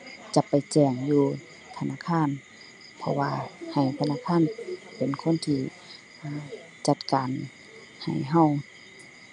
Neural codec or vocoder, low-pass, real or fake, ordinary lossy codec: none; 9.9 kHz; real; none